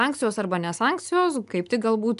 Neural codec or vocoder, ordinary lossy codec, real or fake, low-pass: none; MP3, 96 kbps; real; 10.8 kHz